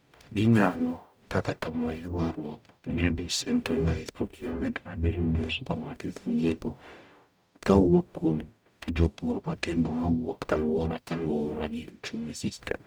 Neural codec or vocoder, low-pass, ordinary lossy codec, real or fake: codec, 44.1 kHz, 0.9 kbps, DAC; none; none; fake